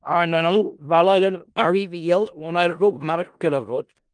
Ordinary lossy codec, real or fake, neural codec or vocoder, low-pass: Opus, 32 kbps; fake; codec, 16 kHz in and 24 kHz out, 0.4 kbps, LongCat-Audio-Codec, four codebook decoder; 9.9 kHz